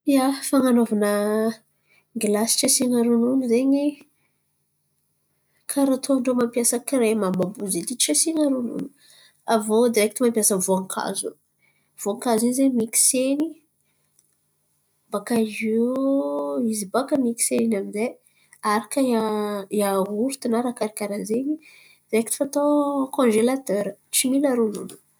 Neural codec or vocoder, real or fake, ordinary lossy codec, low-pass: none; real; none; none